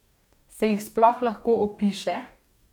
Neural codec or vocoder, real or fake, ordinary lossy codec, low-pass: codec, 44.1 kHz, 2.6 kbps, DAC; fake; none; 19.8 kHz